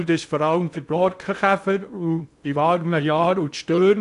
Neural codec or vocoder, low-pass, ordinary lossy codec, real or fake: codec, 16 kHz in and 24 kHz out, 0.6 kbps, FocalCodec, streaming, 2048 codes; 10.8 kHz; none; fake